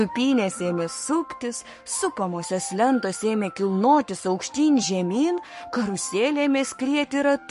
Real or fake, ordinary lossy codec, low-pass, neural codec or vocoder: fake; MP3, 48 kbps; 14.4 kHz; codec, 44.1 kHz, 7.8 kbps, Pupu-Codec